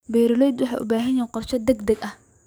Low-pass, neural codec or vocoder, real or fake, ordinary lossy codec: none; codec, 44.1 kHz, 7.8 kbps, DAC; fake; none